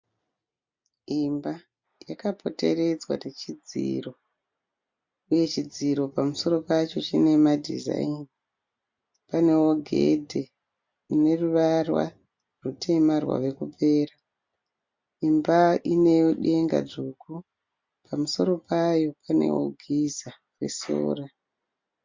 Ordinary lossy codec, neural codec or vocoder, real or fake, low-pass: MP3, 64 kbps; none; real; 7.2 kHz